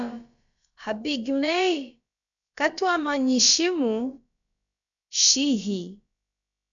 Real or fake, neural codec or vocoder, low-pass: fake; codec, 16 kHz, about 1 kbps, DyCAST, with the encoder's durations; 7.2 kHz